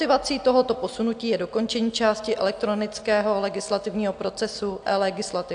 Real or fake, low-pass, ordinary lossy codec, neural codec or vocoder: real; 9.9 kHz; AAC, 64 kbps; none